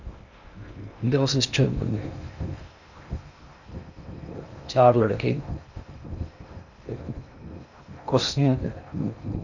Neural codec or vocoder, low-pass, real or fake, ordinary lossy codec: codec, 16 kHz in and 24 kHz out, 0.6 kbps, FocalCodec, streaming, 2048 codes; 7.2 kHz; fake; none